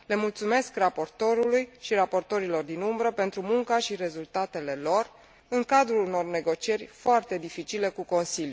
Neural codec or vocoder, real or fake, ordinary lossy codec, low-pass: none; real; none; none